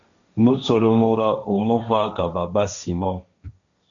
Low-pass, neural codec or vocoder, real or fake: 7.2 kHz; codec, 16 kHz, 1.1 kbps, Voila-Tokenizer; fake